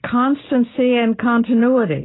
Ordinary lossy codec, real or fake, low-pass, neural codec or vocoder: AAC, 16 kbps; real; 7.2 kHz; none